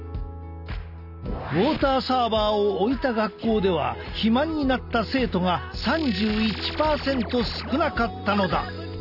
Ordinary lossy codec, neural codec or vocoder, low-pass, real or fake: none; none; 5.4 kHz; real